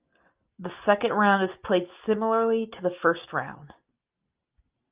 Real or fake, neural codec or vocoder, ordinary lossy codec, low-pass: real; none; Opus, 24 kbps; 3.6 kHz